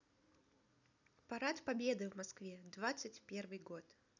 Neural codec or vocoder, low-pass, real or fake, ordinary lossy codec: none; 7.2 kHz; real; none